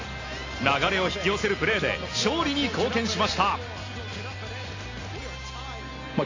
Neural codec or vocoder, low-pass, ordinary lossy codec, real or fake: none; 7.2 kHz; AAC, 32 kbps; real